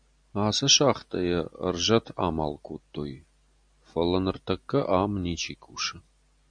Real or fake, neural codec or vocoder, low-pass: real; none; 9.9 kHz